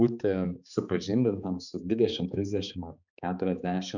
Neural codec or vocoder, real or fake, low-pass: codec, 16 kHz, 4 kbps, X-Codec, HuBERT features, trained on balanced general audio; fake; 7.2 kHz